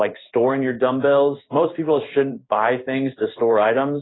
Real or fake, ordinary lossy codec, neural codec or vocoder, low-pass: fake; AAC, 16 kbps; codec, 16 kHz in and 24 kHz out, 1 kbps, XY-Tokenizer; 7.2 kHz